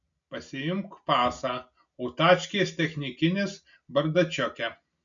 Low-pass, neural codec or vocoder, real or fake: 7.2 kHz; none; real